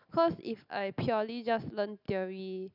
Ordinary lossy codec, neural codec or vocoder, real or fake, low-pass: none; none; real; 5.4 kHz